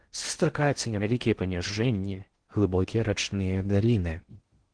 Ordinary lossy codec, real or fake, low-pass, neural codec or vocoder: Opus, 16 kbps; fake; 9.9 kHz; codec, 16 kHz in and 24 kHz out, 0.6 kbps, FocalCodec, streaming, 4096 codes